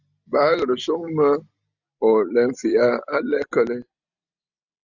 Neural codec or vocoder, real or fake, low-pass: none; real; 7.2 kHz